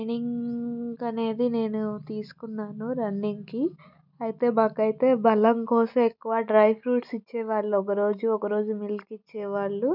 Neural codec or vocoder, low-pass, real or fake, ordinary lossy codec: none; 5.4 kHz; real; none